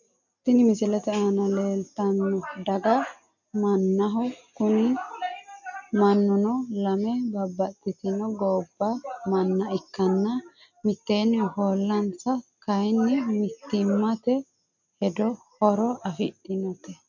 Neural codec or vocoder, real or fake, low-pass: none; real; 7.2 kHz